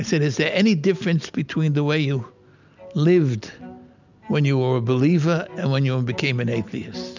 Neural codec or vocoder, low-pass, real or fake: none; 7.2 kHz; real